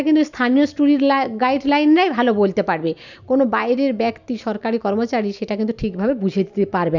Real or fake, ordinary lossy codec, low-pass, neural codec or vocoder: real; none; 7.2 kHz; none